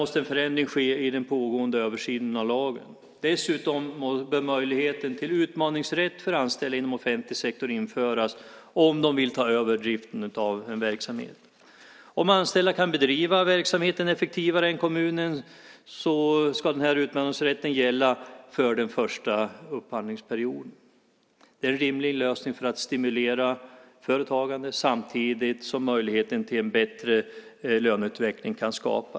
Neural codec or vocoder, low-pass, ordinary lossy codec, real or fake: none; none; none; real